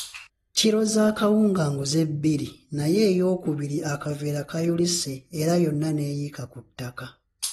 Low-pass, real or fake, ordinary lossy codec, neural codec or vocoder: 19.8 kHz; real; AAC, 32 kbps; none